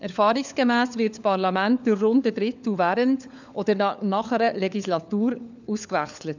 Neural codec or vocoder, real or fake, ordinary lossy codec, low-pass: codec, 16 kHz, 4 kbps, FunCodec, trained on LibriTTS, 50 frames a second; fake; none; 7.2 kHz